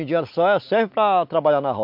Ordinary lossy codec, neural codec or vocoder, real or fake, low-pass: MP3, 48 kbps; none; real; 5.4 kHz